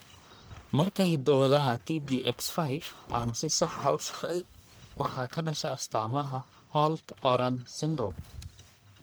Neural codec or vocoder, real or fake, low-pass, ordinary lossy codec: codec, 44.1 kHz, 1.7 kbps, Pupu-Codec; fake; none; none